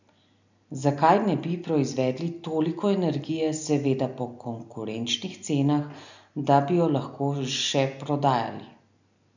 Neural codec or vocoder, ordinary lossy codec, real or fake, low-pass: none; none; real; 7.2 kHz